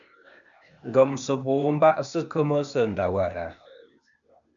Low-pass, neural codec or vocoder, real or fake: 7.2 kHz; codec, 16 kHz, 0.8 kbps, ZipCodec; fake